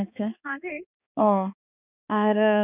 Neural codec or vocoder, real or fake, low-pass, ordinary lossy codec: codec, 16 kHz, 2 kbps, X-Codec, HuBERT features, trained on balanced general audio; fake; 3.6 kHz; none